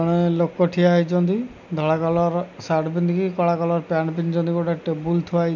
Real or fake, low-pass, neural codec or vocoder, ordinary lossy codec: real; 7.2 kHz; none; none